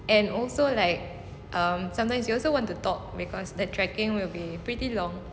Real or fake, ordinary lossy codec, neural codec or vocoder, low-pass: real; none; none; none